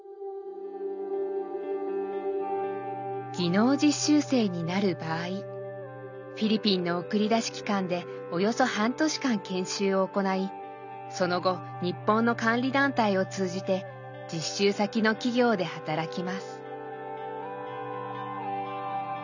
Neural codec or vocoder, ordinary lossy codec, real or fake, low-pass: none; none; real; 7.2 kHz